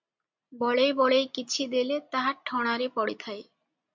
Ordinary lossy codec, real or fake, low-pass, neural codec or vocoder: MP3, 64 kbps; real; 7.2 kHz; none